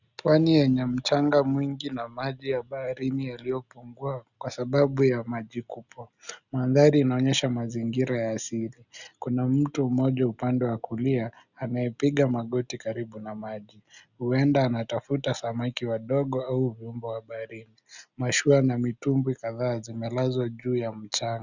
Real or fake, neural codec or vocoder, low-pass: real; none; 7.2 kHz